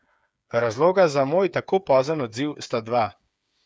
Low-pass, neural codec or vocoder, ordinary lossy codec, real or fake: none; codec, 16 kHz, 8 kbps, FreqCodec, smaller model; none; fake